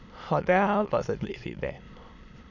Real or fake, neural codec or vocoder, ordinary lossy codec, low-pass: fake; autoencoder, 22.05 kHz, a latent of 192 numbers a frame, VITS, trained on many speakers; none; 7.2 kHz